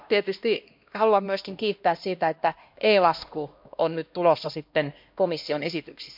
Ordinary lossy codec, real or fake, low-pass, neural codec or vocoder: MP3, 48 kbps; fake; 5.4 kHz; codec, 16 kHz, 1 kbps, X-Codec, HuBERT features, trained on LibriSpeech